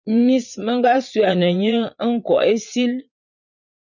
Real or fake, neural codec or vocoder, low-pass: fake; vocoder, 22.05 kHz, 80 mel bands, Vocos; 7.2 kHz